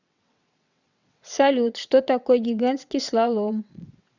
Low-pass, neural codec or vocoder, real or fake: 7.2 kHz; vocoder, 22.05 kHz, 80 mel bands, WaveNeXt; fake